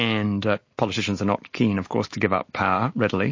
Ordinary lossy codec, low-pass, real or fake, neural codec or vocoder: MP3, 32 kbps; 7.2 kHz; real; none